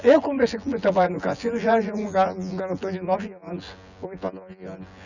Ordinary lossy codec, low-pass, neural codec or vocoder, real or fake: none; 7.2 kHz; vocoder, 24 kHz, 100 mel bands, Vocos; fake